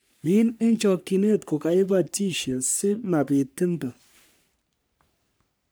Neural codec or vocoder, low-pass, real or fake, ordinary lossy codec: codec, 44.1 kHz, 3.4 kbps, Pupu-Codec; none; fake; none